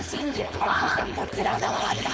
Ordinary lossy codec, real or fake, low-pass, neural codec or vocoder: none; fake; none; codec, 16 kHz, 4.8 kbps, FACodec